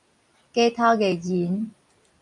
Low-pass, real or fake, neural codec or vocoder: 10.8 kHz; fake; vocoder, 44.1 kHz, 128 mel bands every 256 samples, BigVGAN v2